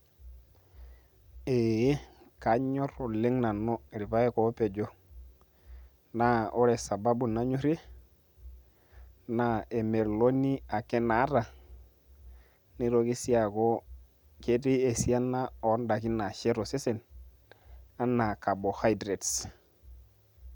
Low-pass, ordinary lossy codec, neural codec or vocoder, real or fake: 19.8 kHz; none; none; real